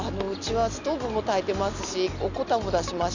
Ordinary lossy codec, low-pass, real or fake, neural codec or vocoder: none; 7.2 kHz; real; none